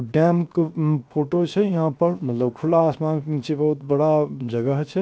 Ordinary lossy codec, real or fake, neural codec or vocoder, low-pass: none; fake; codec, 16 kHz, 0.7 kbps, FocalCodec; none